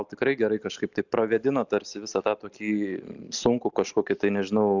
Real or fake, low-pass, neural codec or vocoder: real; 7.2 kHz; none